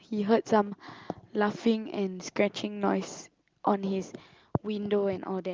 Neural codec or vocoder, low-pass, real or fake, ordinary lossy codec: none; 7.2 kHz; real; Opus, 32 kbps